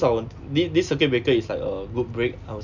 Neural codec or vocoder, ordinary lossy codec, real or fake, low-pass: none; none; real; 7.2 kHz